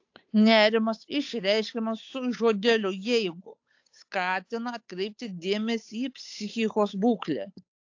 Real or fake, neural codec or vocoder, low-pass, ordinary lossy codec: fake; codec, 16 kHz, 8 kbps, FunCodec, trained on Chinese and English, 25 frames a second; 7.2 kHz; AAC, 48 kbps